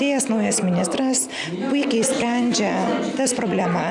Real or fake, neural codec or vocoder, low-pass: real; none; 10.8 kHz